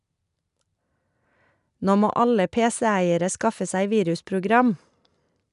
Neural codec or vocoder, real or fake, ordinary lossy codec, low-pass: none; real; none; 10.8 kHz